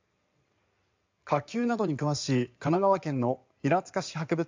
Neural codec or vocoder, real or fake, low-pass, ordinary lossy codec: codec, 16 kHz in and 24 kHz out, 2.2 kbps, FireRedTTS-2 codec; fake; 7.2 kHz; MP3, 64 kbps